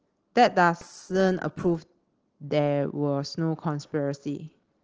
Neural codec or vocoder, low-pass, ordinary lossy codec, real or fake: none; 7.2 kHz; Opus, 16 kbps; real